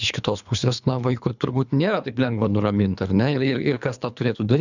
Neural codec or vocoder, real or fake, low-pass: codec, 24 kHz, 3 kbps, HILCodec; fake; 7.2 kHz